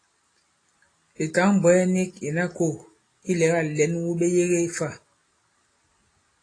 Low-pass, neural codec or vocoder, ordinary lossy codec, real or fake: 9.9 kHz; none; AAC, 32 kbps; real